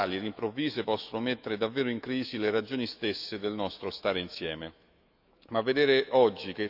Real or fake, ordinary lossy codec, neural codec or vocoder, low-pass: fake; none; autoencoder, 48 kHz, 128 numbers a frame, DAC-VAE, trained on Japanese speech; 5.4 kHz